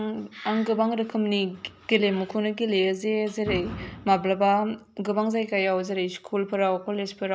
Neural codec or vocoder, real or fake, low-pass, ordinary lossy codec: none; real; none; none